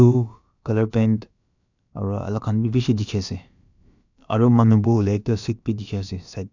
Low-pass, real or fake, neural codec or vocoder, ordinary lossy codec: 7.2 kHz; fake; codec, 16 kHz, about 1 kbps, DyCAST, with the encoder's durations; none